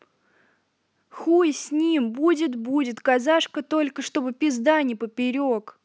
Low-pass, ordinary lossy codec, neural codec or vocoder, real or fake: none; none; none; real